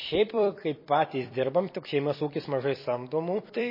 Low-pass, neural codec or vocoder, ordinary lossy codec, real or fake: 5.4 kHz; vocoder, 44.1 kHz, 128 mel bands every 256 samples, BigVGAN v2; MP3, 24 kbps; fake